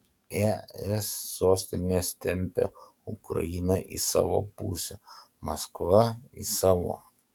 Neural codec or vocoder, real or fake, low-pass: codec, 44.1 kHz, 7.8 kbps, DAC; fake; 19.8 kHz